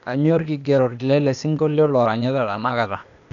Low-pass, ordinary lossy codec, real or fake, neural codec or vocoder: 7.2 kHz; none; fake; codec, 16 kHz, 0.8 kbps, ZipCodec